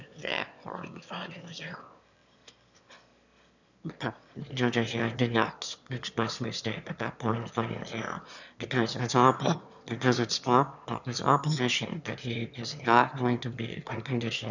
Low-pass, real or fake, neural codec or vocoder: 7.2 kHz; fake; autoencoder, 22.05 kHz, a latent of 192 numbers a frame, VITS, trained on one speaker